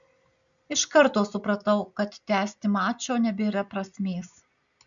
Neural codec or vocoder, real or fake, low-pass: none; real; 7.2 kHz